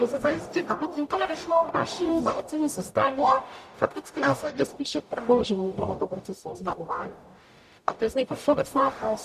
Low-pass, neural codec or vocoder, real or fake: 14.4 kHz; codec, 44.1 kHz, 0.9 kbps, DAC; fake